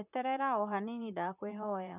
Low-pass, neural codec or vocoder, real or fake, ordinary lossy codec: 3.6 kHz; vocoder, 44.1 kHz, 128 mel bands, Pupu-Vocoder; fake; none